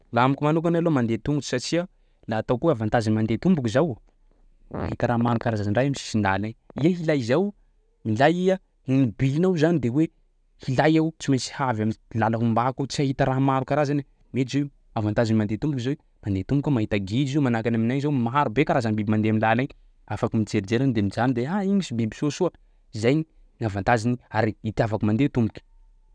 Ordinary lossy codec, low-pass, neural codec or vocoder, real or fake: none; 9.9 kHz; none; real